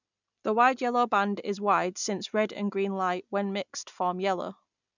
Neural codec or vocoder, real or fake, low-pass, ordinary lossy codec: none; real; 7.2 kHz; none